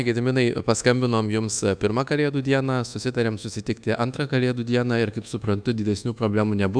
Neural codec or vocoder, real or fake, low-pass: codec, 24 kHz, 1.2 kbps, DualCodec; fake; 9.9 kHz